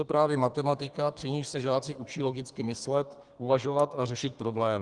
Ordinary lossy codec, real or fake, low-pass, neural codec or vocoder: Opus, 24 kbps; fake; 10.8 kHz; codec, 32 kHz, 1.9 kbps, SNAC